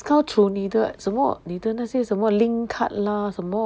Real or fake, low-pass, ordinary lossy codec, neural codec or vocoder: real; none; none; none